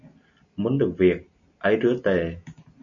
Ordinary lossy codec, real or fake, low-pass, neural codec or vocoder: AAC, 64 kbps; real; 7.2 kHz; none